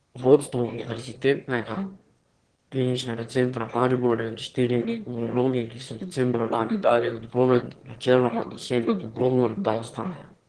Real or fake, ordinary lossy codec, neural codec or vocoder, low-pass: fake; Opus, 16 kbps; autoencoder, 22.05 kHz, a latent of 192 numbers a frame, VITS, trained on one speaker; 9.9 kHz